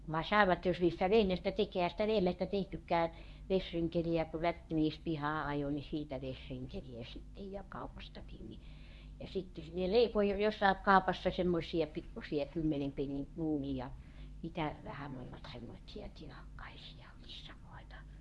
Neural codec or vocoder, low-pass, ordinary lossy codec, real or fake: codec, 24 kHz, 0.9 kbps, WavTokenizer, medium speech release version 1; none; none; fake